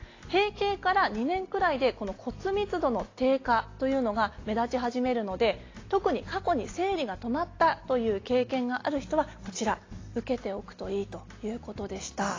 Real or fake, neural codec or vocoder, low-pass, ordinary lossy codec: real; none; 7.2 kHz; AAC, 32 kbps